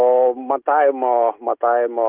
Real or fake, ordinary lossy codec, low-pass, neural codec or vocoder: real; Opus, 32 kbps; 3.6 kHz; none